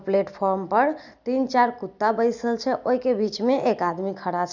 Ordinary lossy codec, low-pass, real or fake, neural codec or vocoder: none; 7.2 kHz; real; none